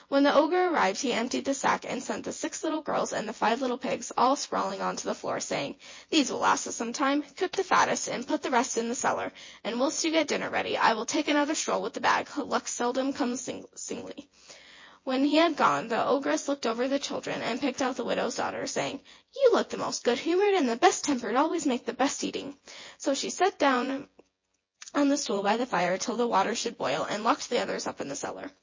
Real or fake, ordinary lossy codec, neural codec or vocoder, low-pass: fake; MP3, 32 kbps; vocoder, 24 kHz, 100 mel bands, Vocos; 7.2 kHz